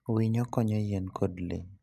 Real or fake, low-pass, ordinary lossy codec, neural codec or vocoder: real; 14.4 kHz; MP3, 96 kbps; none